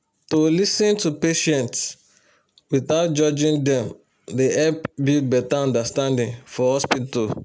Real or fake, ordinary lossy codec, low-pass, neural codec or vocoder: real; none; none; none